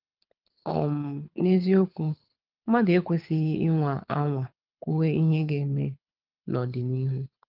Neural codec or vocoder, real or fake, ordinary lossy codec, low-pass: codec, 24 kHz, 6 kbps, HILCodec; fake; Opus, 24 kbps; 5.4 kHz